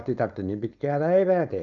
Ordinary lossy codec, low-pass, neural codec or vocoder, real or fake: none; 7.2 kHz; codec, 16 kHz, 4.8 kbps, FACodec; fake